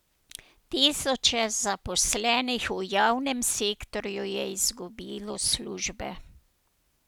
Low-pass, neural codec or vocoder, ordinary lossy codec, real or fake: none; none; none; real